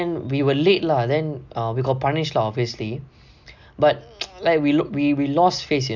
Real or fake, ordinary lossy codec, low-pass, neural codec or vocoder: real; none; 7.2 kHz; none